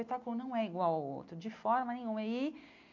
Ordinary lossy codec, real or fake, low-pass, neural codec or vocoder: MP3, 48 kbps; real; 7.2 kHz; none